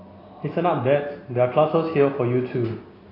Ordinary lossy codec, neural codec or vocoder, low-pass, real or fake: AAC, 24 kbps; none; 5.4 kHz; real